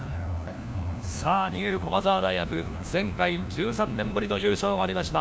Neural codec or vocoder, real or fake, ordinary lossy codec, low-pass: codec, 16 kHz, 1 kbps, FunCodec, trained on LibriTTS, 50 frames a second; fake; none; none